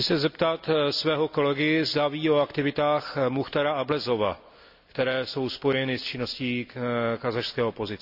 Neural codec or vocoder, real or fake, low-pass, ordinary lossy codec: none; real; 5.4 kHz; none